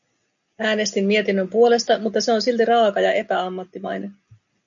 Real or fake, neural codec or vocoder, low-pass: real; none; 7.2 kHz